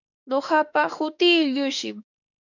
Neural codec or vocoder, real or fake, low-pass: autoencoder, 48 kHz, 32 numbers a frame, DAC-VAE, trained on Japanese speech; fake; 7.2 kHz